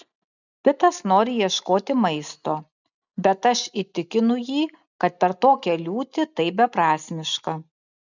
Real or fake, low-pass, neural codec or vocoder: real; 7.2 kHz; none